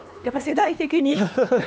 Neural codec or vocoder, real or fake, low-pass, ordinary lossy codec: codec, 16 kHz, 2 kbps, X-Codec, HuBERT features, trained on LibriSpeech; fake; none; none